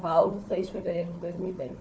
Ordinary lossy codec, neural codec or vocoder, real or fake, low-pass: none; codec, 16 kHz, 4 kbps, FunCodec, trained on Chinese and English, 50 frames a second; fake; none